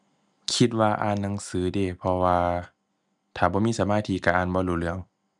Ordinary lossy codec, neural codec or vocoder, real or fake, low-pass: none; none; real; none